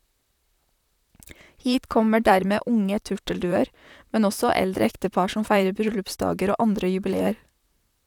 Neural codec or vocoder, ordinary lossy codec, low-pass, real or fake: vocoder, 44.1 kHz, 128 mel bands, Pupu-Vocoder; none; 19.8 kHz; fake